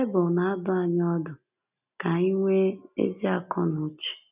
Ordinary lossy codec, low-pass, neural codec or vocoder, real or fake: none; 3.6 kHz; none; real